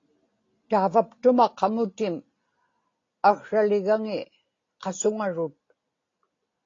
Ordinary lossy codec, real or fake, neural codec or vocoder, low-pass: AAC, 32 kbps; real; none; 7.2 kHz